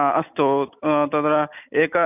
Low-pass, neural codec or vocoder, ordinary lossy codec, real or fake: 3.6 kHz; none; none; real